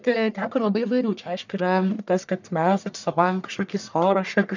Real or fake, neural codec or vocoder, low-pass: fake; codec, 44.1 kHz, 1.7 kbps, Pupu-Codec; 7.2 kHz